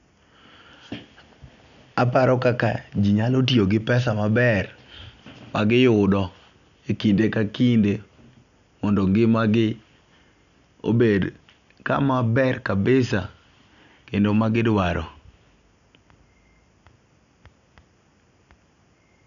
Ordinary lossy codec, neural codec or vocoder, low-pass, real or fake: none; none; 7.2 kHz; real